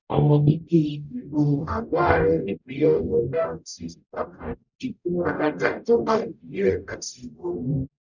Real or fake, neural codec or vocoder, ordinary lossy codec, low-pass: fake; codec, 44.1 kHz, 0.9 kbps, DAC; none; 7.2 kHz